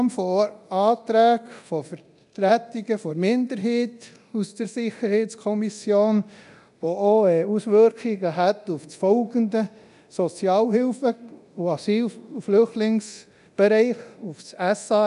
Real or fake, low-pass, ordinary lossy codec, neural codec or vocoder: fake; 10.8 kHz; none; codec, 24 kHz, 0.9 kbps, DualCodec